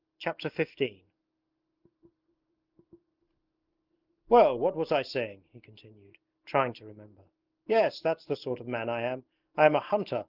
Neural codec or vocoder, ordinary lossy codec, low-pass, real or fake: none; Opus, 32 kbps; 5.4 kHz; real